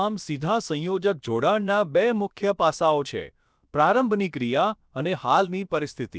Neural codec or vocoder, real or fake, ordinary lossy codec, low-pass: codec, 16 kHz, about 1 kbps, DyCAST, with the encoder's durations; fake; none; none